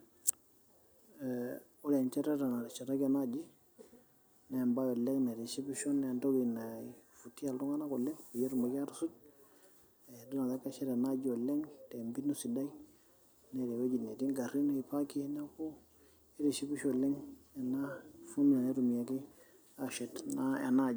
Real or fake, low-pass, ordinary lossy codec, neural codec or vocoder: real; none; none; none